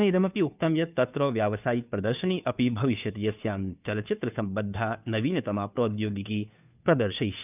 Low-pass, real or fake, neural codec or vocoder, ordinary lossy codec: 3.6 kHz; fake; codec, 16 kHz, 2 kbps, FunCodec, trained on Chinese and English, 25 frames a second; none